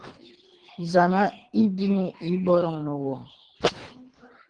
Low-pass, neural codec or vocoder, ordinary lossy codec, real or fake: 9.9 kHz; codec, 24 kHz, 3 kbps, HILCodec; Opus, 16 kbps; fake